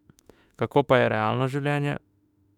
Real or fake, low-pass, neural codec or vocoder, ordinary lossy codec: fake; 19.8 kHz; autoencoder, 48 kHz, 32 numbers a frame, DAC-VAE, trained on Japanese speech; none